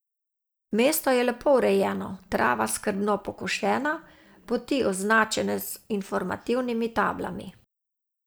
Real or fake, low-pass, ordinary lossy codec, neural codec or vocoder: real; none; none; none